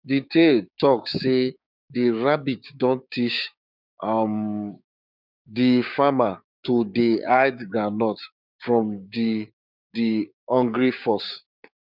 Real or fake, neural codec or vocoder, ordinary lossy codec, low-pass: fake; codec, 16 kHz, 6 kbps, DAC; none; 5.4 kHz